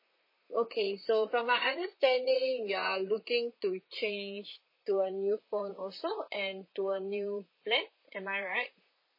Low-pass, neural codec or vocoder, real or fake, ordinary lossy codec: 5.4 kHz; vocoder, 44.1 kHz, 128 mel bands, Pupu-Vocoder; fake; MP3, 24 kbps